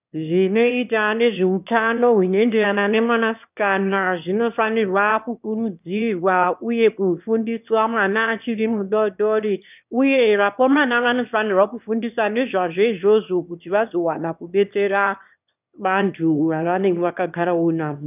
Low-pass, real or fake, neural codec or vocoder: 3.6 kHz; fake; autoencoder, 22.05 kHz, a latent of 192 numbers a frame, VITS, trained on one speaker